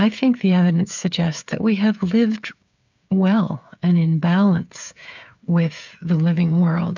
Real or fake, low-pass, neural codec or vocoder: fake; 7.2 kHz; codec, 16 kHz, 8 kbps, FreqCodec, smaller model